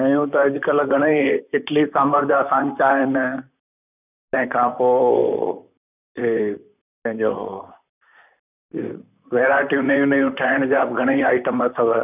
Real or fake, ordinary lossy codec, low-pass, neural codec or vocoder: fake; none; 3.6 kHz; vocoder, 44.1 kHz, 128 mel bands, Pupu-Vocoder